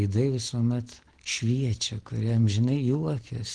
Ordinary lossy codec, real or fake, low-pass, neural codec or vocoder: Opus, 16 kbps; real; 9.9 kHz; none